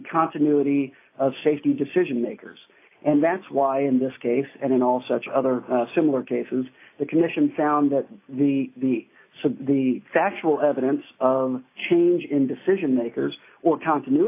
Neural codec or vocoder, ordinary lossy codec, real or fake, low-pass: none; AAC, 24 kbps; real; 3.6 kHz